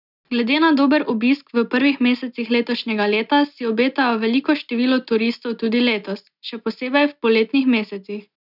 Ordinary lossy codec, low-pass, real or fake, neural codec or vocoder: none; 5.4 kHz; real; none